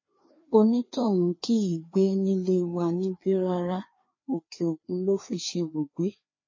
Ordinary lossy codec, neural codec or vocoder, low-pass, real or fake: MP3, 32 kbps; codec, 16 kHz, 2 kbps, FreqCodec, larger model; 7.2 kHz; fake